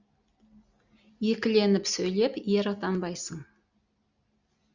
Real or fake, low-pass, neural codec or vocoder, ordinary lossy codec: real; 7.2 kHz; none; Opus, 64 kbps